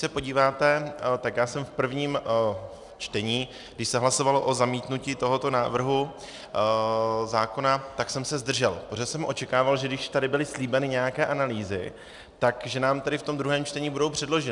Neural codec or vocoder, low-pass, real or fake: none; 10.8 kHz; real